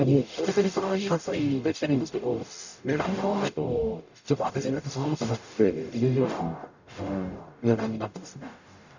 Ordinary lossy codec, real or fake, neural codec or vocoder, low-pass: none; fake; codec, 44.1 kHz, 0.9 kbps, DAC; 7.2 kHz